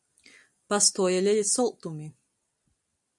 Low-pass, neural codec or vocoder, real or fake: 10.8 kHz; none; real